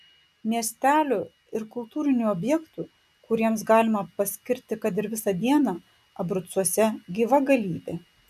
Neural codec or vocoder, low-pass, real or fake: none; 14.4 kHz; real